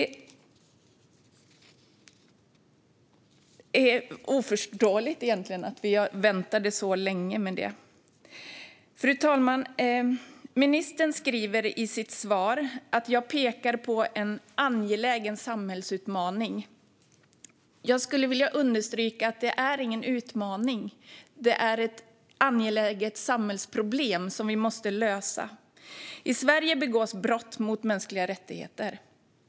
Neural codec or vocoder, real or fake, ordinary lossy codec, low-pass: none; real; none; none